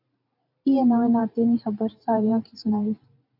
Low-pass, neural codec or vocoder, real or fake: 5.4 kHz; vocoder, 44.1 kHz, 128 mel bands every 512 samples, BigVGAN v2; fake